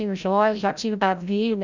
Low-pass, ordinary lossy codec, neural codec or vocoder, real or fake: 7.2 kHz; none; codec, 16 kHz, 0.5 kbps, FreqCodec, larger model; fake